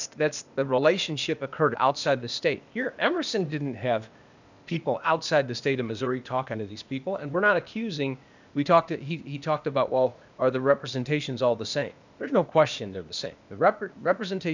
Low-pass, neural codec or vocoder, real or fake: 7.2 kHz; codec, 16 kHz, 0.8 kbps, ZipCodec; fake